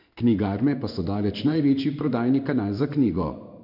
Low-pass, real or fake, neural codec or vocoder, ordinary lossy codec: 5.4 kHz; fake; codec, 16 kHz in and 24 kHz out, 1 kbps, XY-Tokenizer; none